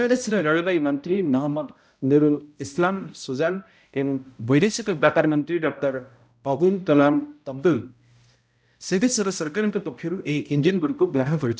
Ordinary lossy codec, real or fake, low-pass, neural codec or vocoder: none; fake; none; codec, 16 kHz, 0.5 kbps, X-Codec, HuBERT features, trained on balanced general audio